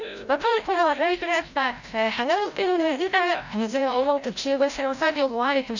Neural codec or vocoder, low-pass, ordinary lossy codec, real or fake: codec, 16 kHz, 0.5 kbps, FreqCodec, larger model; 7.2 kHz; none; fake